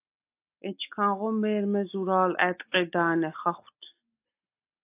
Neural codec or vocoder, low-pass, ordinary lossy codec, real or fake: none; 3.6 kHz; AAC, 24 kbps; real